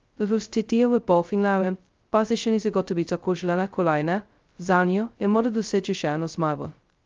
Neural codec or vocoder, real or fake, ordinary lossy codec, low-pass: codec, 16 kHz, 0.2 kbps, FocalCodec; fake; Opus, 32 kbps; 7.2 kHz